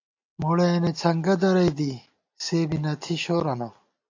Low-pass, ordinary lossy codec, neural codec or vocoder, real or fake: 7.2 kHz; AAC, 48 kbps; none; real